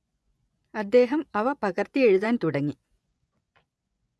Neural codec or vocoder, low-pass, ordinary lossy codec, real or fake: vocoder, 24 kHz, 100 mel bands, Vocos; none; none; fake